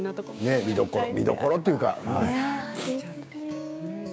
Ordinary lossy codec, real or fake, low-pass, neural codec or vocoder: none; fake; none; codec, 16 kHz, 6 kbps, DAC